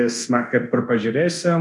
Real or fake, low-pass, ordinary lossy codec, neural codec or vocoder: fake; 10.8 kHz; MP3, 96 kbps; codec, 24 kHz, 0.9 kbps, DualCodec